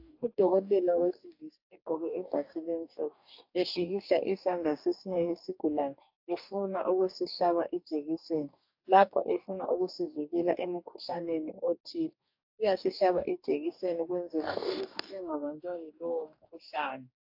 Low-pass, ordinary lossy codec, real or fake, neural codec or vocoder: 5.4 kHz; AAC, 48 kbps; fake; codec, 44.1 kHz, 2.6 kbps, DAC